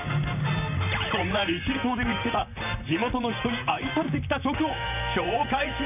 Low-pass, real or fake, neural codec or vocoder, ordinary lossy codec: 3.6 kHz; fake; vocoder, 44.1 kHz, 128 mel bands, Pupu-Vocoder; none